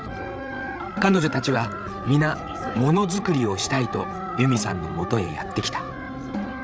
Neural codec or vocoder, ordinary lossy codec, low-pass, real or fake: codec, 16 kHz, 8 kbps, FreqCodec, larger model; none; none; fake